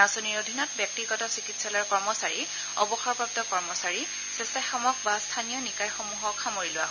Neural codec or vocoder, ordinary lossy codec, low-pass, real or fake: none; none; 7.2 kHz; real